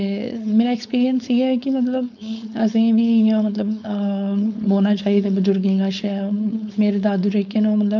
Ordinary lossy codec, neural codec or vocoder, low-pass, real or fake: none; codec, 16 kHz, 4.8 kbps, FACodec; 7.2 kHz; fake